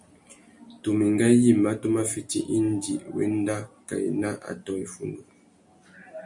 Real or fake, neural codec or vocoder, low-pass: real; none; 10.8 kHz